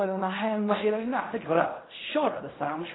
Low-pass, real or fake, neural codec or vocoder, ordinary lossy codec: 7.2 kHz; fake; codec, 16 kHz in and 24 kHz out, 0.4 kbps, LongCat-Audio-Codec, fine tuned four codebook decoder; AAC, 16 kbps